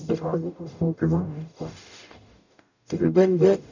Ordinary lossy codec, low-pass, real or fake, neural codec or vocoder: none; 7.2 kHz; fake; codec, 44.1 kHz, 0.9 kbps, DAC